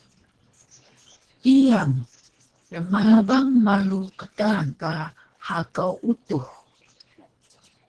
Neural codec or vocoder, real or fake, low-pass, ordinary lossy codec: codec, 24 kHz, 1.5 kbps, HILCodec; fake; 10.8 kHz; Opus, 16 kbps